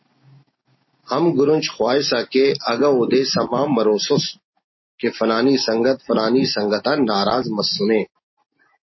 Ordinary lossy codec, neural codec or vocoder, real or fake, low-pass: MP3, 24 kbps; none; real; 7.2 kHz